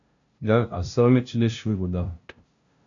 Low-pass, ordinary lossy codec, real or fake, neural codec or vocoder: 7.2 kHz; MP3, 96 kbps; fake; codec, 16 kHz, 0.5 kbps, FunCodec, trained on LibriTTS, 25 frames a second